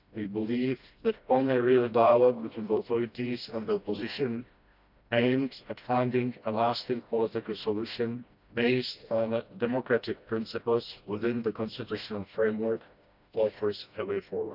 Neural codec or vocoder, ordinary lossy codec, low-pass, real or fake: codec, 16 kHz, 1 kbps, FreqCodec, smaller model; none; 5.4 kHz; fake